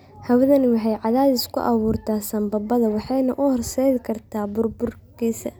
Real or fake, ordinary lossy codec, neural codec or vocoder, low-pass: real; none; none; none